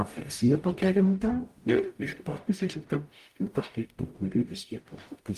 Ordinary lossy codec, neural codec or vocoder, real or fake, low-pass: Opus, 32 kbps; codec, 44.1 kHz, 0.9 kbps, DAC; fake; 14.4 kHz